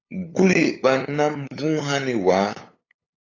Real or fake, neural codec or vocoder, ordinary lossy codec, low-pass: fake; codec, 16 kHz, 8 kbps, FunCodec, trained on LibriTTS, 25 frames a second; AAC, 32 kbps; 7.2 kHz